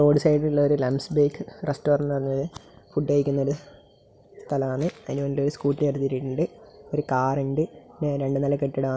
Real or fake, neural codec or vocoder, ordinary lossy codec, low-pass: real; none; none; none